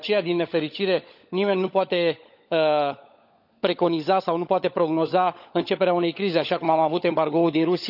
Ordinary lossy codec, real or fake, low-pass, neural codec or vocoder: none; fake; 5.4 kHz; codec, 16 kHz, 16 kbps, FunCodec, trained on LibriTTS, 50 frames a second